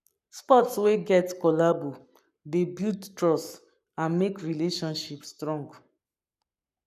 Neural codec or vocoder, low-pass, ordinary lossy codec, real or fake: codec, 44.1 kHz, 7.8 kbps, Pupu-Codec; 14.4 kHz; none; fake